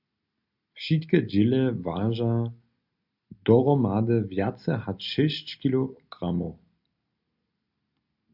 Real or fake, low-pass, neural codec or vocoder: real; 5.4 kHz; none